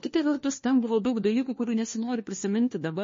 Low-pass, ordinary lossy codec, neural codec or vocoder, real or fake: 7.2 kHz; MP3, 32 kbps; codec, 16 kHz, 1 kbps, FunCodec, trained on LibriTTS, 50 frames a second; fake